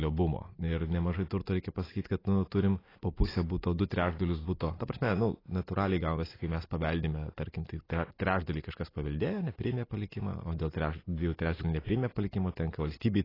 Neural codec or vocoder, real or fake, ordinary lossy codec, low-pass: none; real; AAC, 24 kbps; 5.4 kHz